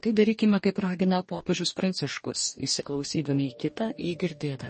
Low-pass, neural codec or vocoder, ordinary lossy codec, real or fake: 10.8 kHz; codec, 44.1 kHz, 2.6 kbps, DAC; MP3, 32 kbps; fake